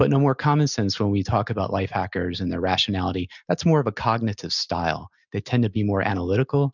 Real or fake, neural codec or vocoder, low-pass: real; none; 7.2 kHz